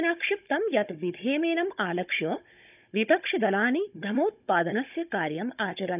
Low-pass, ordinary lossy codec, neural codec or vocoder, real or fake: 3.6 kHz; none; codec, 16 kHz, 16 kbps, FunCodec, trained on Chinese and English, 50 frames a second; fake